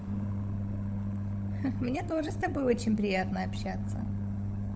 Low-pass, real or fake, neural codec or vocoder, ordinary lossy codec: none; fake; codec, 16 kHz, 16 kbps, FunCodec, trained on LibriTTS, 50 frames a second; none